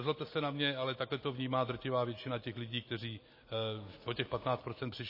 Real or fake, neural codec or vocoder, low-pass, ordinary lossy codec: real; none; 5.4 kHz; MP3, 24 kbps